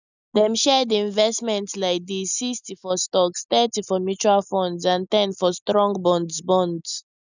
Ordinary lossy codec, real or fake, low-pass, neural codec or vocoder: none; real; 7.2 kHz; none